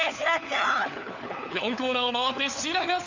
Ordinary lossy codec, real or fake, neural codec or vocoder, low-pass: none; fake; codec, 16 kHz, 4 kbps, FunCodec, trained on LibriTTS, 50 frames a second; 7.2 kHz